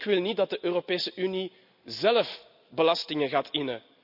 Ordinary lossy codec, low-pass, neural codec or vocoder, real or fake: none; 5.4 kHz; none; real